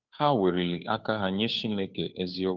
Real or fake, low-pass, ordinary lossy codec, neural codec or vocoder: fake; 7.2 kHz; Opus, 32 kbps; codec, 16 kHz, 2 kbps, FunCodec, trained on Chinese and English, 25 frames a second